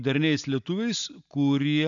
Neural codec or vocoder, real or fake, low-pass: none; real; 7.2 kHz